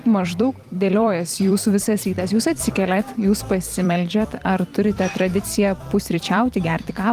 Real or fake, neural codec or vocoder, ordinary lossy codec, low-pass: fake; vocoder, 44.1 kHz, 128 mel bands every 256 samples, BigVGAN v2; Opus, 32 kbps; 14.4 kHz